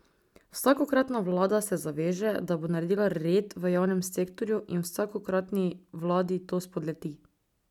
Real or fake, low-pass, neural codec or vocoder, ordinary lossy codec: real; 19.8 kHz; none; none